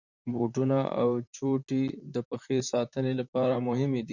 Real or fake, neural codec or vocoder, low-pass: fake; vocoder, 44.1 kHz, 128 mel bands, Pupu-Vocoder; 7.2 kHz